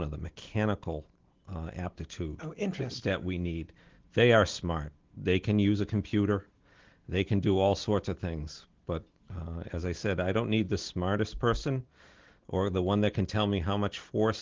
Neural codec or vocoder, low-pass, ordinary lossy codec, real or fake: none; 7.2 kHz; Opus, 16 kbps; real